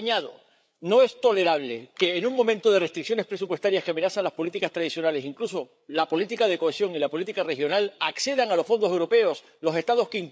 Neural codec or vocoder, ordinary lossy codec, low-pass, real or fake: codec, 16 kHz, 8 kbps, FreqCodec, larger model; none; none; fake